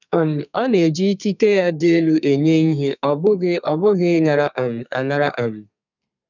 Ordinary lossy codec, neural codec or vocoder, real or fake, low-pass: none; codec, 32 kHz, 1.9 kbps, SNAC; fake; 7.2 kHz